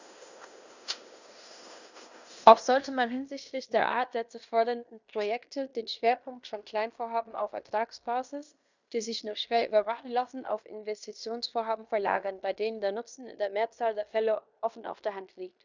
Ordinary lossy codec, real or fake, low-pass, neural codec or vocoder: Opus, 64 kbps; fake; 7.2 kHz; codec, 16 kHz in and 24 kHz out, 0.9 kbps, LongCat-Audio-Codec, fine tuned four codebook decoder